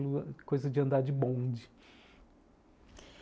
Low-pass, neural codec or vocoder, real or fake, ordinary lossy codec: none; none; real; none